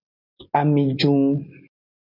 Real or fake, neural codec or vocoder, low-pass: real; none; 5.4 kHz